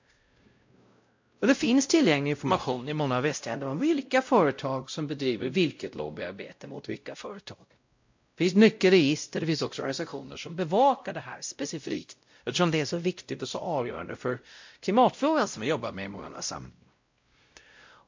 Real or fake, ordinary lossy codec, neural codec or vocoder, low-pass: fake; MP3, 48 kbps; codec, 16 kHz, 0.5 kbps, X-Codec, WavLM features, trained on Multilingual LibriSpeech; 7.2 kHz